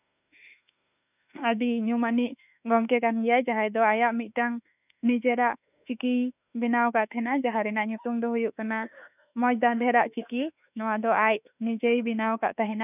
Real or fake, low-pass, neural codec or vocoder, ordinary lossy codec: fake; 3.6 kHz; autoencoder, 48 kHz, 32 numbers a frame, DAC-VAE, trained on Japanese speech; none